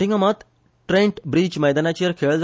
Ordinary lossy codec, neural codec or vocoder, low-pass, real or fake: none; none; none; real